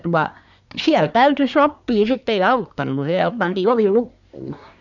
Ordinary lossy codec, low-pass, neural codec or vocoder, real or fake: none; 7.2 kHz; codec, 24 kHz, 1 kbps, SNAC; fake